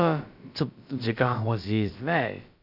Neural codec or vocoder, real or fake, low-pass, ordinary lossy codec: codec, 16 kHz, about 1 kbps, DyCAST, with the encoder's durations; fake; 5.4 kHz; none